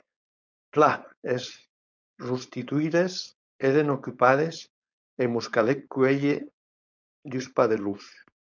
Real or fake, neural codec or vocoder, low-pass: fake; codec, 16 kHz, 4.8 kbps, FACodec; 7.2 kHz